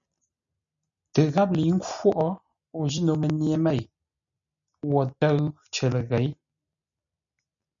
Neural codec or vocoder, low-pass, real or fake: none; 7.2 kHz; real